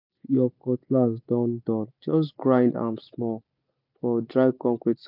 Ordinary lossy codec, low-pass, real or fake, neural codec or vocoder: none; 5.4 kHz; real; none